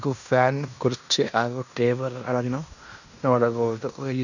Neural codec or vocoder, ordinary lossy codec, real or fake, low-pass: codec, 16 kHz in and 24 kHz out, 0.9 kbps, LongCat-Audio-Codec, fine tuned four codebook decoder; none; fake; 7.2 kHz